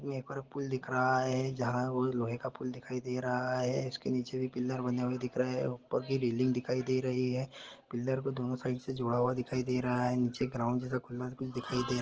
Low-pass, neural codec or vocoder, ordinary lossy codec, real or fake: 7.2 kHz; none; Opus, 16 kbps; real